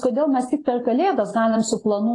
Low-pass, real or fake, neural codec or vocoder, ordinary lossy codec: 10.8 kHz; real; none; AAC, 32 kbps